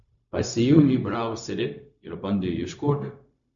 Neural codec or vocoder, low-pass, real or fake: codec, 16 kHz, 0.4 kbps, LongCat-Audio-Codec; 7.2 kHz; fake